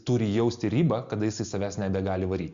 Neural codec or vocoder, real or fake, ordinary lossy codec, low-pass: none; real; Opus, 64 kbps; 7.2 kHz